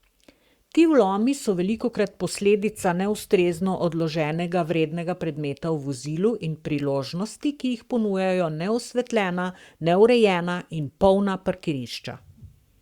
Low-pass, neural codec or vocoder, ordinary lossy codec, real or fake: 19.8 kHz; codec, 44.1 kHz, 7.8 kbps, Pupu-Codec; Opus, 64 kbps; fake